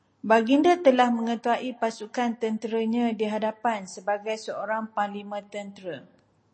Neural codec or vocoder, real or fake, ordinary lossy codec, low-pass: none; real; MP3, 32 kbps; 9.9 kHz